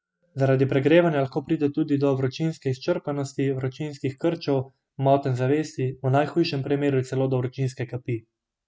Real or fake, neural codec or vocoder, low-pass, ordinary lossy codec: real; none; none; none